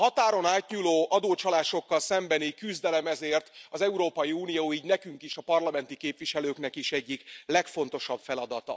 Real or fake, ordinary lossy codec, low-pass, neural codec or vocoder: real; none; none; none